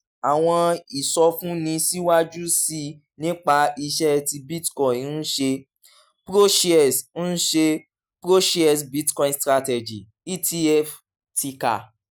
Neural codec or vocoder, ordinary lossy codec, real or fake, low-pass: none; none; real; none